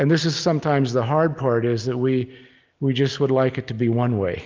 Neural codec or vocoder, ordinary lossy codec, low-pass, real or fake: none; Opus, 24 kbps; 7.2 kHz; real